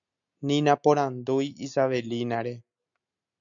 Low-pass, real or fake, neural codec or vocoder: 7.2 kHz; real; none